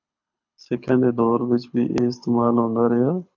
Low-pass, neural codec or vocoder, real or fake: 7.2 kHz; codec, 24 kHz, 6 kbps, HILCodec; fake